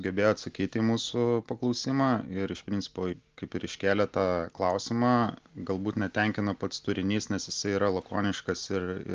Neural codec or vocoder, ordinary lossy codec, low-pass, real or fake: none; Opus, 32 kbps; 7.2 kHz; real